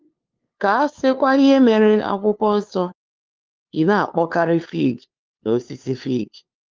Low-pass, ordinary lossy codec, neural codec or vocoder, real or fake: 7.2 kHz; Opus, 24 kbps; codec, 16 kHz, 2 kbps, FunCodec, trained on LibriTTS, 25 frames a second; fake